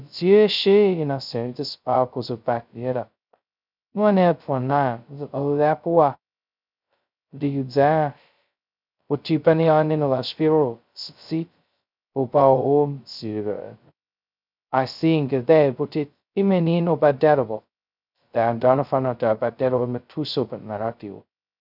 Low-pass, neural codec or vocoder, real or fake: 5.4 kHz; codec, 16 kHz, 0.2 kbps, FocalCodec; fake